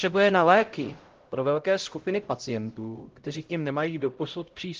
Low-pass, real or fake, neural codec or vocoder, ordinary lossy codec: 7.2 kHz; fake; codec, 16 kHz, 0.5 kbps, X-Codec, HuBERT features, trained on LibriSpeech; Opus, 16 kbps